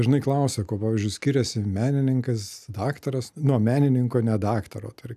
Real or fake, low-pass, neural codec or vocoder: real; 14.4 kHz; none